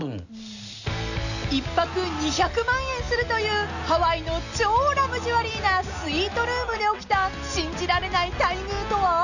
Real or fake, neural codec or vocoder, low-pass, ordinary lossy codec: real; none; 7.2 kHz; none